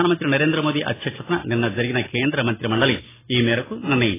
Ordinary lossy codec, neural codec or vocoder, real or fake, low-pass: AAC, 16 kbps; vocoder, 44.1 kHz, 128 mel bands every 256 samples, BigVGAN v2; fake; 3.6 kHz